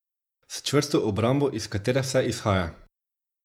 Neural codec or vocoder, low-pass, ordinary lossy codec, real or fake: vocoder, 48 kHz, 128 mel bands, Vocos; 19.8 kHz; none; fake